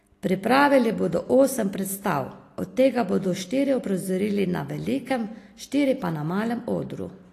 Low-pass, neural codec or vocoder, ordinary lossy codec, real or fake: 14.4 kHz; none; AAC, 48 kbps; real